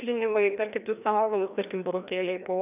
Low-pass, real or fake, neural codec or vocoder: 3.6 kHz; fake; codec, 16 kHz, 1 kbps, FreqCodec, larger model